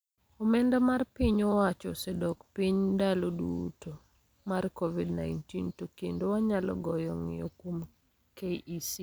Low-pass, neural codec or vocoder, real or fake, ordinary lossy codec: none; none; real; none